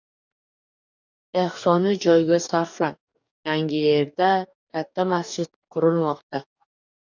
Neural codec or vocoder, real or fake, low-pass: codec, 44.1 kHz, 2.6 kbps, DAC; fake; 7.2 kHz